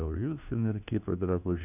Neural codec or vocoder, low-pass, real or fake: codec, 16 kHz, 1 kbps, FunCodec, trained on LibriTTS, 50 frames a second; 3.6 kHz; fake